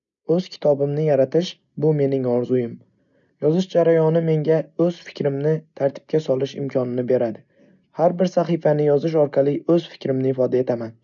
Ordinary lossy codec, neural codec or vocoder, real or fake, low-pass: none; none; real; 7.2 kHz